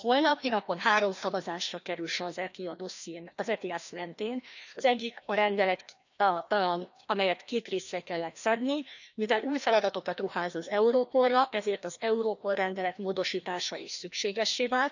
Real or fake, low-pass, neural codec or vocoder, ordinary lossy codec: fake; 7.2 kHz; codec, 16 kHz, 1 kbps, FreqCodec, larger model; none